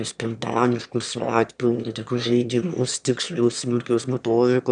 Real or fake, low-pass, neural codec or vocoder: fake; 9.9 kHz; autoencoder, 22.05 kHz, a latent of 192 numbers a frame, VITS, trained on one speaker